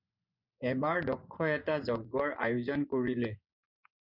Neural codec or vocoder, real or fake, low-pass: vocoder, 24 kHz, 100 mel bands, Vocos; fake; 5.4 kHz